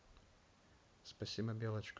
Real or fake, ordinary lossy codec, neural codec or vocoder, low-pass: real; none; none; none